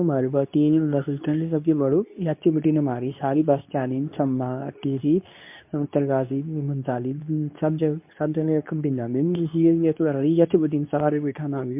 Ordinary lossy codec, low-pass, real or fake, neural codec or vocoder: MP3, 32 kbps; 3.6 kHz; fake; codec, 24 kHz, 0.9 kbps, WavTokenizer, medium speech release version 2